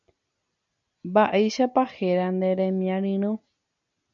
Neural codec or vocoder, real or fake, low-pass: none; real; 7.2 kHz